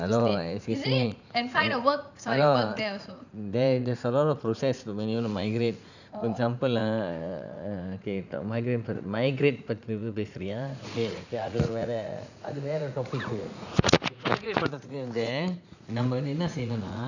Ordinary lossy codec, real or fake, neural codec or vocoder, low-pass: none; fake; vocoder, 44.1 kHz, 80 mel bands, Vocos; 7.2 kHz